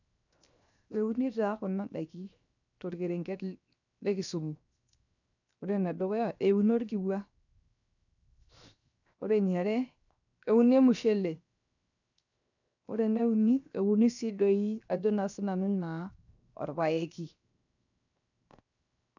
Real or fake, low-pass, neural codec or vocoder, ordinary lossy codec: fake; 7.2 kHz; codec, 16 kHz, 0.7 kbps, FocalCodec; none